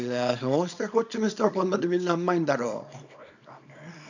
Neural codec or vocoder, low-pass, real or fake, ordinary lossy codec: codec, 24 kHz, 0.9 kbps, WavTokenizer, small release; 7.2 kHz; fake; none